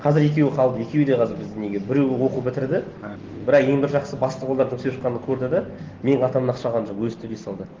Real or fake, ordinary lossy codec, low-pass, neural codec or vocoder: real; Opus, 16 kbps; 7.2 kHz; none